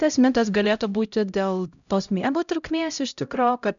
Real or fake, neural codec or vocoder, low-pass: fake; codec, 16 kHz, 0.5 kbps, X-Codec, HuBERT features, trained on LibriSpeech; 7.2 kHz